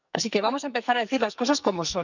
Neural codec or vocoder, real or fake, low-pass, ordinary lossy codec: codec, 44.1 kHz, 2.6 kbps, SNAC; fake; 7.2 kHz; none